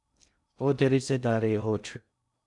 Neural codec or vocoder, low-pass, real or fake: codec, 16 kHz in and 24 kHz out, 0.6 kbps, FocalCodec, streaming, 2048 codes; 10.8 kHz; fake